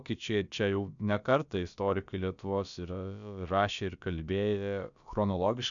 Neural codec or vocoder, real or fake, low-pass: codec, 16 kHz, about 1 kbps, DyCAST, with the encoder's durations; fake; 7.2 kHz